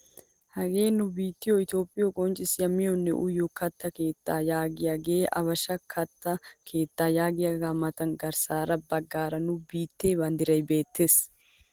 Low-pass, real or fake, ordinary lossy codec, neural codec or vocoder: 19.8 kHz; real; Opus, 16 kbps; none